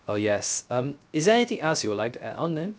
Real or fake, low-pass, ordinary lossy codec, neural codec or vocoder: fake; none; none; codec, 16 kHz, 0.3 kbps, FocalCodec